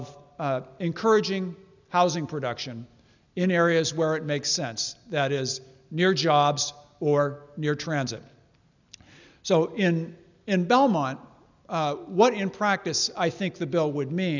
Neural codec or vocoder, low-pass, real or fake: none; 7.2 kHz; real